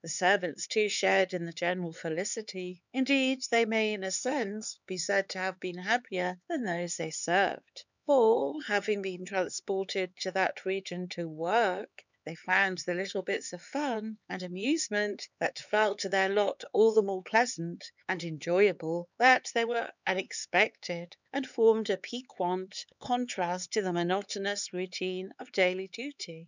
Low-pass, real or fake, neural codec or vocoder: 7.2 kHz; fake; codec, 16 kHz, 4 kbps, X-Codec, HuBERT features, trained on LibriSpeech